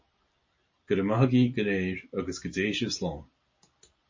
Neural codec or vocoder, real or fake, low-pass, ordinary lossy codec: none; real; 7.2 kHz; MP3, 32 kbps